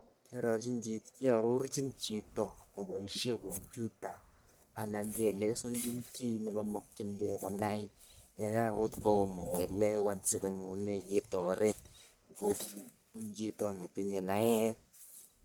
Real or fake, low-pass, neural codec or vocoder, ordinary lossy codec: fake; none; codec, 44.1 kHz, 1.7 kbps, Pupu-Codec; none